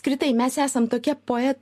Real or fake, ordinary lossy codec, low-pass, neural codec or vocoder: real; MP3, 64 kbps; 14.4 kHz; none